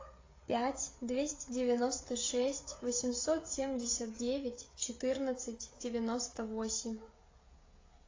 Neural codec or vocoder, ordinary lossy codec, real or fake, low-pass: codec, 16 kHz, 16 kbps, FreqCodec, smaller model; AAC, 32 kbps; fake; 7.2 kHz